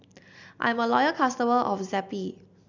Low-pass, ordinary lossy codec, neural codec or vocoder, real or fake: 7.2 kHz; none; none; real